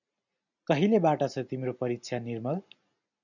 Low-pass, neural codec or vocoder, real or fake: 7.2 kHz; none; real